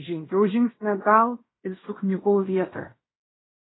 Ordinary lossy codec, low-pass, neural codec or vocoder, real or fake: AAC, 16 kbps; 7.2 kHz; codec, 16 kHz in and 24 kHz out, 0.9 kbps, LongCat-Audio-Codec, fine tuned four codebook decoder; fake